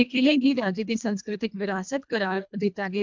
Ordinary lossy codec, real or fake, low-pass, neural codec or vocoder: MP3, 64 kbps; fake; 7.2 kHz; codec, 24 kHz, 1.5 kbps, HILCodec